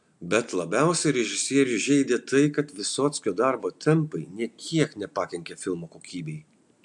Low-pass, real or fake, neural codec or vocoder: 10.8 kHz; real; none